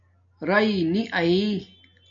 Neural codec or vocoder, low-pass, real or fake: none; 7.2 kHz; real